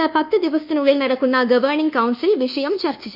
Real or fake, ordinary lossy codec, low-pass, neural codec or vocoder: fake; none; 5.4 kHz; codec, 24 kHz, 1.2 kbps, DualCodec